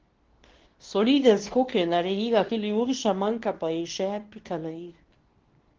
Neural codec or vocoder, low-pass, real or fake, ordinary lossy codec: codec, 24 kHz, 0.9 kbps, WavTokenizer, medium speech release version 2; 7.2 kHz; fake; Opus, 16 kbps